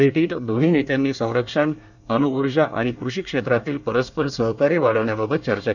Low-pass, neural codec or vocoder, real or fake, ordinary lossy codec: 7.2 kHz; codec, 24 kHz, 1 kbps, SNAC; fake; none